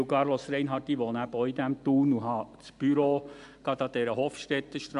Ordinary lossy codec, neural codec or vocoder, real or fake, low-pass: AAC, 96 kbps; none; real; 10.8 kHz